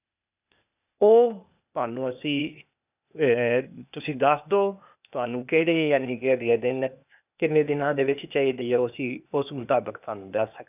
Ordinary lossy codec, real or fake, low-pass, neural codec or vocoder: none; fake; 3.6 kHz; codec, 16 kHz, 0.8 kbps, ZipCodec